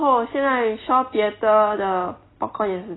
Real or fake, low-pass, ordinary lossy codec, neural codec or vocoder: real; 7.2 kHz; AAC, 16 kbps; none